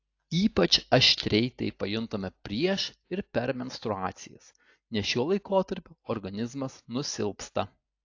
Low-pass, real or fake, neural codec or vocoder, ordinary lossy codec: 7.2 kHz; real; none; AAC, 48 kbps